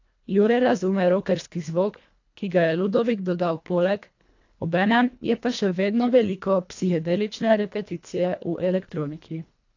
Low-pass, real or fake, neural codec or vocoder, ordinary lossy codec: 7.2 kHz; fake; codec, 24 kHz, 1.5 kbps, HILCodec; AAC, 48 kbps